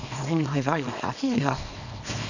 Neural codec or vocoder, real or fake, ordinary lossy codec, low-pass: codec, 24 kHz, 0.9 kbps, WavTokenizer, small release; fake; none; 7.2 kHz